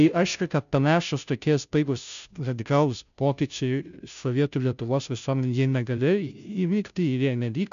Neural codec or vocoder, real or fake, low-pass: codec, 16 kHz, 0.5 kbps, FunCodec, trained on Chinese and English, 25 frames a second; fake; 7.2 kHz